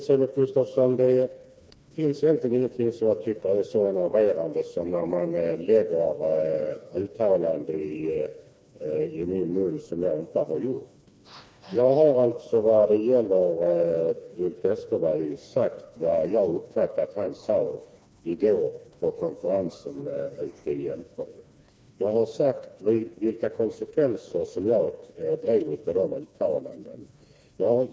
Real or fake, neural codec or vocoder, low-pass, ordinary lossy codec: fake; codec, 16 kHz, 2 kbps, FreqCodec, smaller model; none; none